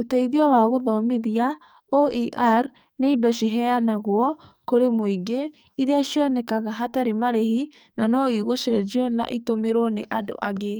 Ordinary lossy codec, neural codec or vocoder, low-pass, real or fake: none; codec, 44.1 kHz, 2.6 kbps, SNAC; none; fake